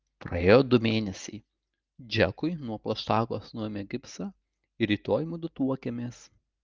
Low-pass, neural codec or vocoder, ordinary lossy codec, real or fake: 7.2 kHz; none; Opus, 32 kbps; real